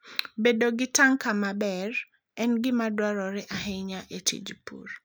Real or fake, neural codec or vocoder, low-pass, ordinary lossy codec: real; none; none; none